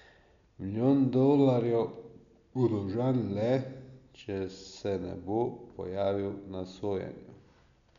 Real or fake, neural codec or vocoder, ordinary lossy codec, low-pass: real; none; none; 7.2 kHz